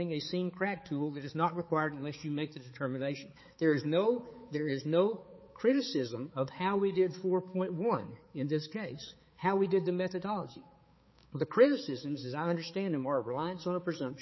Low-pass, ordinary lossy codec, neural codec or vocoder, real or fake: 7.2 kHz; MP3, 24 kbps; codec, 16 kHz, 4 kbps, X-Codec, HuBERT features, trained on balanced general audio; fake